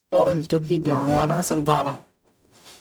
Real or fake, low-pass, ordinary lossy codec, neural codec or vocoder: fake; none; none; codec, 44.1 kHz, 0.9 kbps, DAC